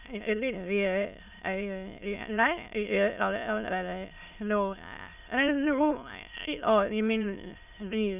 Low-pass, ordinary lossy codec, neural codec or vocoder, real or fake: 3.6 kHz; none; autoencoder, 22.05 kHz, a latent of 192 numbers a frame, VITS, trained on many speakers; fake